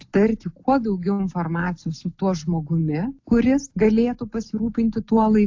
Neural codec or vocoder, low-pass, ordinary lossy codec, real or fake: none; 7.2 kHz; AAC, 48 kbps; real